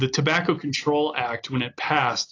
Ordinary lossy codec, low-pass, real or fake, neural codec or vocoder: AAC, 32 kbps; 7.2 kHz; real; none